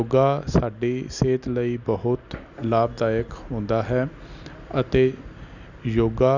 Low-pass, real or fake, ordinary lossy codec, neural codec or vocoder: 7.2 kHz; real; none; none